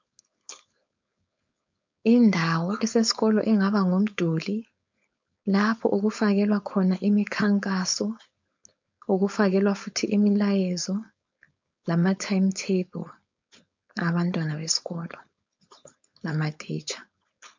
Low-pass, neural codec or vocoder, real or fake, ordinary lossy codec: 7.2 kHz; codec, 16 kHz, 4.8 kbps, FACodec; fake; MP3, 64 kbps